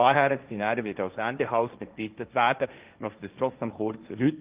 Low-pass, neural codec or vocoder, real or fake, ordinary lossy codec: 3.6 kHz; codec, 16 kHz, 1.1 kbps, Voila-Tokenizer; fake; Opus, 24 kbps